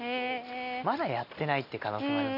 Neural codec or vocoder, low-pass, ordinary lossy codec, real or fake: none; 5.4 kHz; none; real